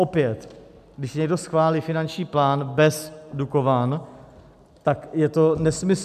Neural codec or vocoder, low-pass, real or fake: none; 14.4 kHz; real